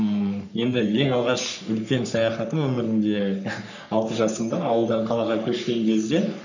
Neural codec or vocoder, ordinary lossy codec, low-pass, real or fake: codec, 44.1 kHz, 3.4 kbps, Pupu-Codec; none; 7.2 kHz; fake